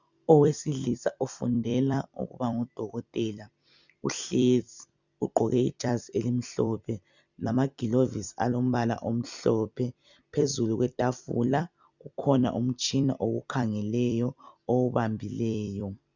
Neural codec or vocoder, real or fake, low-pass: vocoder, 44.1 kHz, 128 mel bands every 256 samples, BigVGAN v2; fake; 7.2 kHz